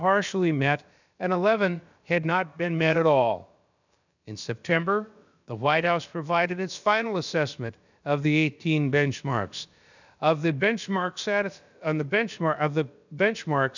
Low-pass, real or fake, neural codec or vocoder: 7.2 kHz; fake; codec, 16 kHz, about 1 kbps, DyCAST, with the encoder's durations